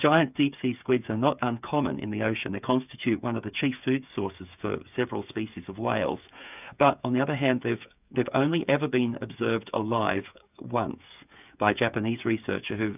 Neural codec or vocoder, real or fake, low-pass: codec, 16 kHz, 8 kbps, FreqCodec, smaller model; fake; 3.6 kHz